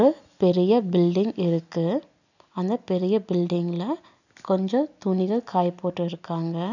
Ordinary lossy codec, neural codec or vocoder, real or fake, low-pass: none; none; real; 7.2 kHz